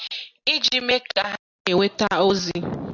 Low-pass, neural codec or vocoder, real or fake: 7.2 kHz; none; real